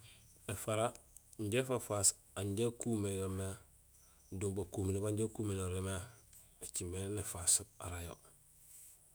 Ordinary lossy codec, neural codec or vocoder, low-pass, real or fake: none; autoencoder, 48 kHz, 128 numbers a frame, DAC-VAE, trained on Japanese speech; none; fake